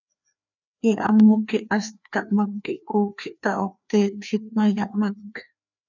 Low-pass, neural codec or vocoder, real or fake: 7.2 kHz; codec, 16 kHz, 2 kbps, FreqCodec, larger model; fake